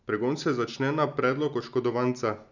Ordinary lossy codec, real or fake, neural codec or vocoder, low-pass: none; real; none; 7.2 kHz